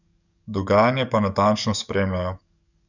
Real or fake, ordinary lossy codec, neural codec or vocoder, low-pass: fake; none; codec, 44.1 kHz, 7.8 kbps, DAC; 7.2 kHz